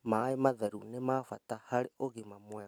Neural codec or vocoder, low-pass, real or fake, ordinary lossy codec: none; none; real; none